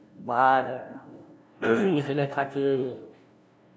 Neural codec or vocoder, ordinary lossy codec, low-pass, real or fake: codec, 16 kHz, 0.5 kbps, FunCodec, trained on LibriTTS, 25 frames a second; none; none; fake